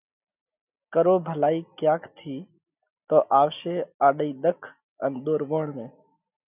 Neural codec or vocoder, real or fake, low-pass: none; real; 3.6 kHz